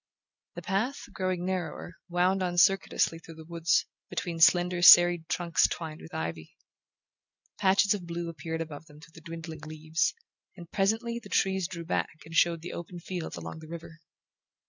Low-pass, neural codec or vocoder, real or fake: 7.2 kHz; none; real